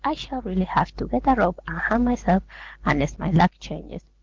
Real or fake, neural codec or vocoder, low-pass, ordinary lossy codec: real; none; 7.2 kHz; Opus, 16 kbps